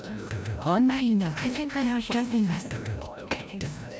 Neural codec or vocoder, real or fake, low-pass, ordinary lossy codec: codec, 16 kHz, 0.5 kbps, FreqCodec, larger model; fake; none; none